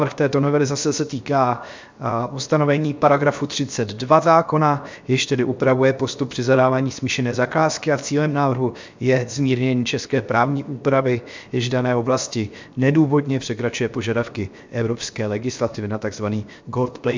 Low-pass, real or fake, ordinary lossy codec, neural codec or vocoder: 7.2 kHz; fake; MP3, 64 kbps; codec, 16 kHz, 0.7 kbps, FocalCodec